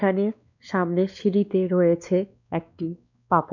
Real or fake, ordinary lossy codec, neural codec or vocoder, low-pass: fake; none; codec, 16 kHz, 2 kbps, X-Codec, WavLM features, trained on Multilingual LibriSpeech; 7.2 kHz